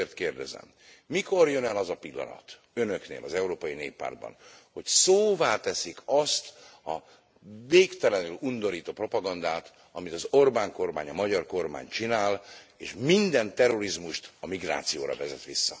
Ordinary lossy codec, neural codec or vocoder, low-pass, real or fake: none; none; none; real